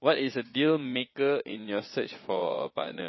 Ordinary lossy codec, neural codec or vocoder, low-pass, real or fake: MP3, 24 kbps; vocoder, 22.05 kHz, 80 mel bands, Vocos; 7.2 kHz; fake